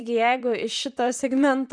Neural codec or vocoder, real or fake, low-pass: vocoder, 44.1 kHz, 128 mel bands, Pupu-Vocoder; fake; 9.9 kHz